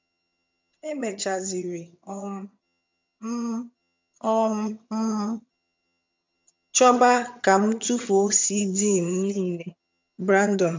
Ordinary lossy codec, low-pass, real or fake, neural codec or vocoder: MP3, 64 kbps; 7.2 kHz; fake; vocoder, 22.05 kHz, 80 mel bands, HiFi-GAN